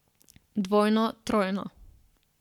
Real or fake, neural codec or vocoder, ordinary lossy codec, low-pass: fake; codec, 44.1 kHz, 7.8 kbps, Pupu-Codec; none; 19.8 kHz